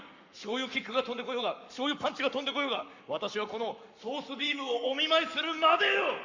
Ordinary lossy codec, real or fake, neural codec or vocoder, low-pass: Opus, 64 kbps; fake; codec, 44.1 kHz, 7.8 kbps, Pupu-Codec; 7.2 kHz